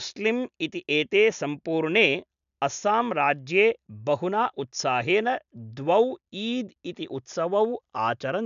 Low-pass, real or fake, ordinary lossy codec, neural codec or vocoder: 7.2 kHz; real; none; none